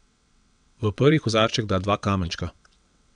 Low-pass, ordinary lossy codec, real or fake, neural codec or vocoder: 9.9 kHz; none; fake; vocoder, 22.05 kHz, 80 mel bands, WaveNeXt